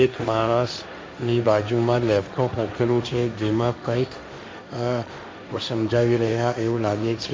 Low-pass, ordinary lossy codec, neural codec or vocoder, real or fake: none; none; codec, 16 kHz, 1.1 kbps, Voila-Tokenizer; fake